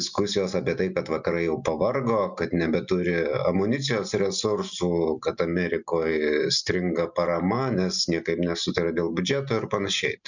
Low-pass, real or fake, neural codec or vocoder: 7.2 kHz; real; none